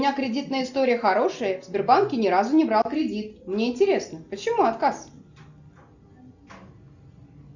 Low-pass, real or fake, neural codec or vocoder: 7.2 kHz; real; none